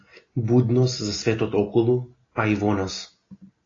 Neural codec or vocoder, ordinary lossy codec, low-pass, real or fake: none; AAC, 32 kbps; 7.2 kHz; real